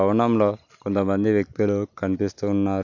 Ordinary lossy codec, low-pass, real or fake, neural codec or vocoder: none; 7.2 kHz; real; none